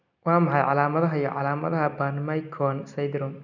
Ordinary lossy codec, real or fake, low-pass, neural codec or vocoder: none; real; 7.2 kHz; none